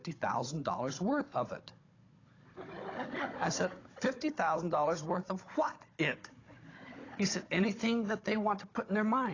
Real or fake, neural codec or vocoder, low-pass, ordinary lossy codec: fake; codec, 16 kHz, 16 kbps, FunCodec, trained on Chinese and English, 50 frames a second; 7.2 kHz; AAC, 32 kbps